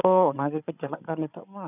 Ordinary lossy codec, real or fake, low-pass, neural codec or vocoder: none; fake; 3.6 kHz; codec, 16 kHz, 8 kbps, FreqCodec, larger model